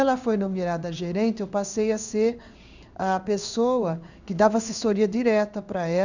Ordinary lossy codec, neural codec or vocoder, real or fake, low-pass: none; codec, 16 kHz in and 24 kHz out, 1 kbps, XY-Tokenizer; fake; 7.2 kHz